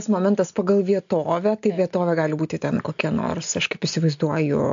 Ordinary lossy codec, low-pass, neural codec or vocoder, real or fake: AAC, 64 kbps; 7.2 kHz; none; real